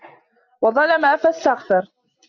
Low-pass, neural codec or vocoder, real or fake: 7.2 kHz; none; real